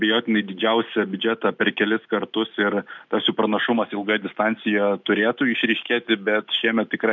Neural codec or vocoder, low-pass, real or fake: none; 7.2 kHz; real